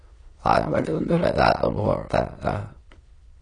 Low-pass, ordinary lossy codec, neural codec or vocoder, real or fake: 9.9 kHz; AAC, 32 kbps; autoencoder, 22.05 kHz, a latent of 192 numbers a frame, VITS, trained on many speakers; fake